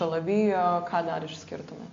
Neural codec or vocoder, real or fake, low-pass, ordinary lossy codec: none; real; 7.2 kHz; AAC, 48 kbps